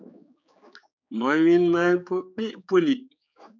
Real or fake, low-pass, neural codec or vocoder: fake; 7.2 kHz; codec, 16 kHz, 4 kbps, X-Codec, HuBERT features, trained on general audio